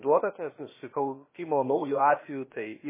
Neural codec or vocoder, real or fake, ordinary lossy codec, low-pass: codec, 16 kHz, about 1 kbps, DyCAST, with the encoder's durations; fake; MP3, 16 kbps; 3.6 kHz